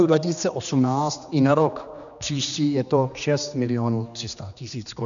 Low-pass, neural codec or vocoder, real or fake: 7.2 kHz; codec, 16 kHz, 2 kbps, X-Codec, HuBERT features, trained on general audio; fake